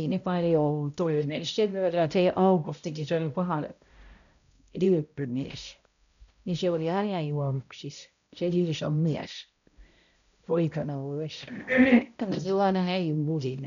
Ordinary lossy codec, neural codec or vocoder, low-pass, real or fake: MP3, 96 kbps; codec, 16 kHz, 0.5 kbps, X-Codec, HuBERT features, trained on balanced general audio; 7.2 kHz; fake